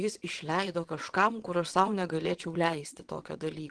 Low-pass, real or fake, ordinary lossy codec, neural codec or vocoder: 9.9 kHz; fake; Opus, 16 kbps; vocoder, 22.05 kHz, 80 mel bands, WaveNeXt